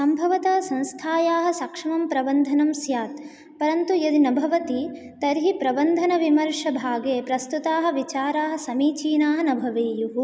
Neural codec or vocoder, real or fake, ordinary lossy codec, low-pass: none; real; none; none